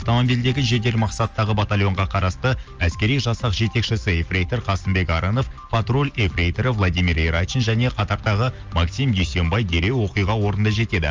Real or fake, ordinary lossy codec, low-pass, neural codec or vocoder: real; Opus, 24 kbps; 7.2 kHz; none